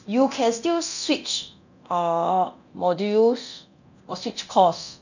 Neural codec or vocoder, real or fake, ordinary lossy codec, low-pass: codec, 24 kHz, 0.9 kbps, DualCodec; fake; none; 7.2 kHz